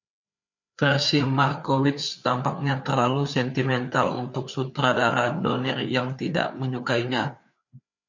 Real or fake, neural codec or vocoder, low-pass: fake; codec, 16 kHz, 4 kbps, FreqCodec, larger model; 7.2 kHz